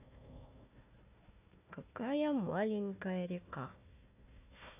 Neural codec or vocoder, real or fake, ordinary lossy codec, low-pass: codec, 16 kHz, 1 kbps, FunCodec, trained on Chinese and English, 50 frames a second; fake; none; 3.6 kHz